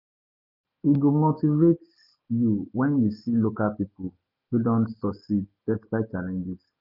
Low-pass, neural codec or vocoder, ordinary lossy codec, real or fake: 5.4 kHz; none; none; real